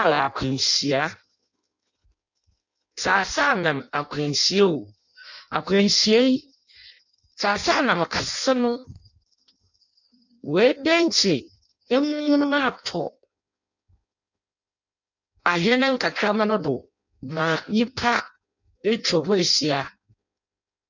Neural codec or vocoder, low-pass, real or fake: codec, 16 kHz in and 24 kHz out, 0.6 kbps, FireRedTTS-2 codec; 7.2 kHz; fake